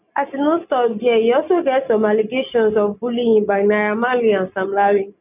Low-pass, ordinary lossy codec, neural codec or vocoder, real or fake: 3.6 kHz; none; none; real